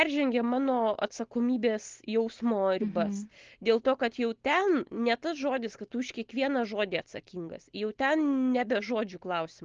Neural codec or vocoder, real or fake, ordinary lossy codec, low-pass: none; real; Opus, 16 kbps; 7.2 kHz